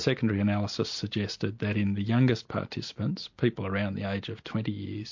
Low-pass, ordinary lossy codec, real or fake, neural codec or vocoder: 7.2 kHz; MP3, 48 kbps; real; none